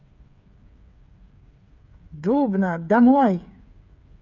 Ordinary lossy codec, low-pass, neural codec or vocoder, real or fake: none; 7.2 kHz; codec, 16 kHz, 8 kbps, FreqCodec, smaller model; fake